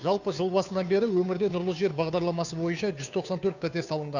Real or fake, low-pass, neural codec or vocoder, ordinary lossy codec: fake; 7.2 kHz; codec, 16 kHz, 2 kbps, FunCodec, trained on Chinese and English, 25 frames a second; none